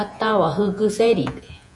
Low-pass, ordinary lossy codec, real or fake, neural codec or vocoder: 10.8 kHz; MP3, 96 kbps; fake; vocoder, 48 kHz, 128 mel bands, Vocos